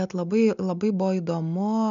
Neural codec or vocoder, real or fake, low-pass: none; real; 7.2 kHz